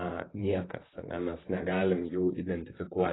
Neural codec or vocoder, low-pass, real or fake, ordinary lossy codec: vocoder, 44.1 kHz, 128 mel bands, Pupu-Vocoder; 7.2 kHz; fake; AAC, 16 kbps